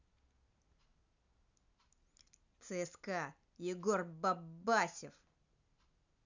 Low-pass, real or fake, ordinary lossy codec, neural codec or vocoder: 7.2 kHz; real; none; none